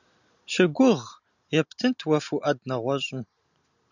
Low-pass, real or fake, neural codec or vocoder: 7.2 kHz; real; none